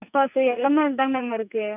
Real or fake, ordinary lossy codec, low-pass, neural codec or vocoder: fake; none; 3.6 kHz; codec, 44.1 kHz, 2.6 kbps, SNAC